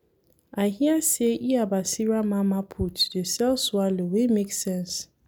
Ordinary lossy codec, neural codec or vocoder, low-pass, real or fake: none; none; none; real